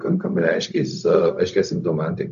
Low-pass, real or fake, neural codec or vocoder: 7.2 kHz; fake; codec, 16 kHz, 0.4 kbps, LongCat-Audio-Codec